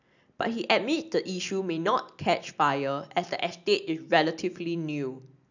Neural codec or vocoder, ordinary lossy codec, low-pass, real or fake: none; none; 7.2 kHz; real